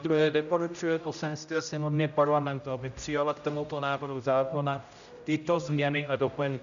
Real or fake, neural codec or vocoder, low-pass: fake; codec, 16 kHz, 0.5 kbps, X-Codec, HuBERT features, trained on general audio; 7.2 kHz